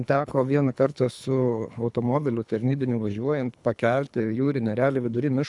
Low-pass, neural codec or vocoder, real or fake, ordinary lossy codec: 10.8 kHz; codec, 24 kHz, 3 kbps, HILCodec; fake; MP3, 96 kbps